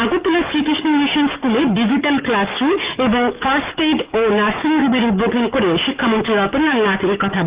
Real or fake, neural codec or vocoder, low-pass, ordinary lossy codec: fake; codec, 44.1 kHz, 7.8 kbps, DAC; 3.6 kHz; Opus, 24 kbps